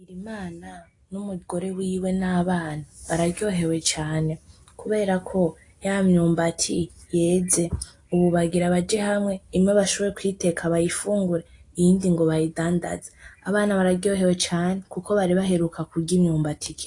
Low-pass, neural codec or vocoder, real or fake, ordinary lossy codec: 10.8 kHz; none; real; AAC, 48 kbps